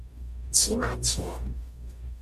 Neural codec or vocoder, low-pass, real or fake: codec, 44.1 kHz, 0.9 kbps, DAC; 14.4 kHz; fake